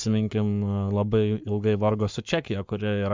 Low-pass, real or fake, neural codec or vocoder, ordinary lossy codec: 7.2 kHz; fake; codec, 44.1 kHz, 7.8 kbps, Pupu-Codec; MP3, 64 kbps